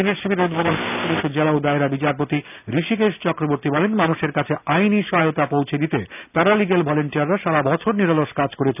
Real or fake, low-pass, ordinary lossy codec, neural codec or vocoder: real; 3.6 kHz; none; none